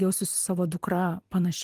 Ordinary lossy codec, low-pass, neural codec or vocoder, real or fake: Opus, 24 kbps; 14.4 kHz; vocoder, 44.1 kHz, 128 mel bands every 256 samples, BigVGAN v2; fake